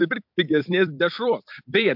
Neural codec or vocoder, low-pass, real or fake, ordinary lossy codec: vocoder, 44.1 kHz, 128 mel bands every 256 samples, BigVGAN v2; 5.4 kHz; fake; MP3, 48 kbps